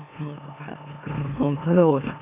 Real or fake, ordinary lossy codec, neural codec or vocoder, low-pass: fake; none; autoencoder, 44.1 kHz, a latent of 192 numbers a frame, MeloTTS; 3.6 kHz